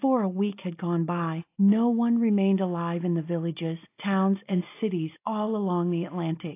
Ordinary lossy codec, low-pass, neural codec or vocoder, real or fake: AAC, 24 kbps; 3.6 kHz; none; real